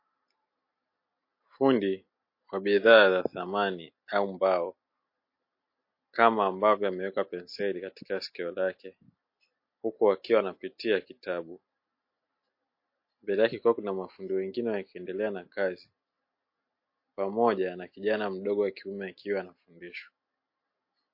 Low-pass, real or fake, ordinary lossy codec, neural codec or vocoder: 5.4 kHz; real; MP3, 32 kbps; none